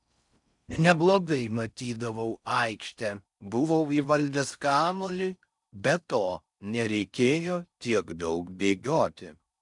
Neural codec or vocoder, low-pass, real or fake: codec, 16 kHz in and 24 kHz out, 0.6 kbps, FocalCodec, streaming, 4096 codes; 10.8 kHz; fake